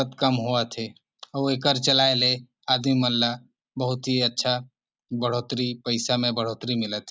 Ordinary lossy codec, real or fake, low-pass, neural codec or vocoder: none; real; none; none